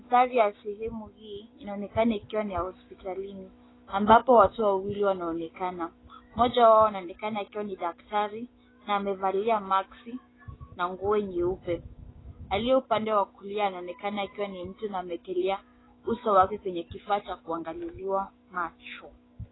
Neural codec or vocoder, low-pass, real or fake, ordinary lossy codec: autoencoder, 48 kHz, 128 numbers a frame, DAC-VAE, trained on Japanese speech; 7.2 kHz; fake; AAC, 16 kbps